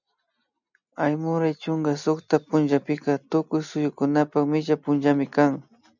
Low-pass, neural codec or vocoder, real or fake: 7.2 kHz; none; real